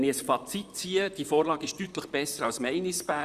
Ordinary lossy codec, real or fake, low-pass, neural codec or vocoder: Opus, 64 kbps; fake; 14.4 kHz; vocoder, 44.1 kHz, 128 mel bands, Pupu-Vocoder